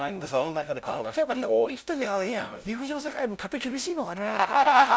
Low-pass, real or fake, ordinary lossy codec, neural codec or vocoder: none; fake; none; codec, 16 kHz, 0.5 kbps, FunCodec, trained on LibriTTS, 25 frames a second